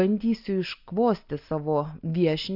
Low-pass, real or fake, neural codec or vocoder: 5.4 kHz; real; none